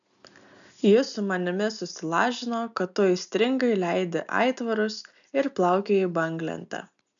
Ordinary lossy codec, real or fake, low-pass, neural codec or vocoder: MP3, 96 kbps; real; 7.2 kHz; none